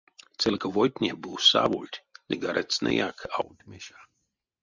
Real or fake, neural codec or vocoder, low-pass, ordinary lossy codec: real; none; 7.2 kHz; Opus, 64 kbps